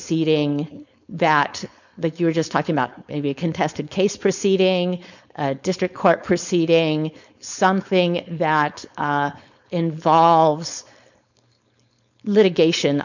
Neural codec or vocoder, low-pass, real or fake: codec, 16 kHz, 4.8 kbps, FACodec; 7.2 kHz; fake